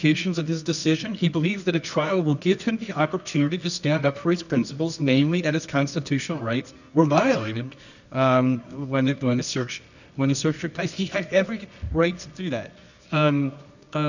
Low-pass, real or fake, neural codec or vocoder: 7.2 kHz; fake; codec, 24 kHz, 0.9 kbps, WavTokenizer, medium music audio release